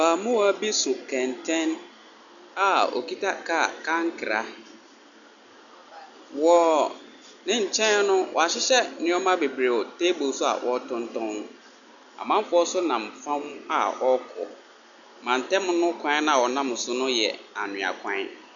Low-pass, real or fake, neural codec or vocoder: 7.2 kHz; real; none